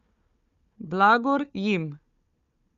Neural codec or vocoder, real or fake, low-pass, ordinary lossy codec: codec, 16 kHz, 4 kbps, FunCodec, trained on Chinese and English, 50 frames a second; fake; 7.2 kHz; Opus, 64 kbps